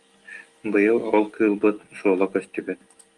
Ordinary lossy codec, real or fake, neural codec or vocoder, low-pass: Opus, 24 kbps; real; none; 10.8 kHz